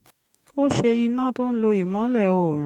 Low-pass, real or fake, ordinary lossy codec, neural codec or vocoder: 19.8 kHz; fake; none; codec, 44.1 kHz, 2.6 kbps, DAC